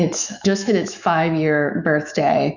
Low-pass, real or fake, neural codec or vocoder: 7.2 kHz; fake; autoencoder, 48 kHz, 128 numbers a frame, DAC-VAE, trained on Japanese speech